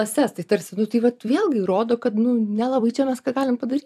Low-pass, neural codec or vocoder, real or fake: 14.4 kHz; vocoder, 44.1 kHz, 128 mel bands every 256 samples, BigVGAN v2; fake